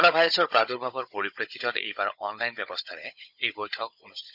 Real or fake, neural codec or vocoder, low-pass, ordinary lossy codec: fake; codec, 16 kHz, 16 kbps, FunCodec, trained on Chinese and English, 50 frames a second; 5.4 kHz; none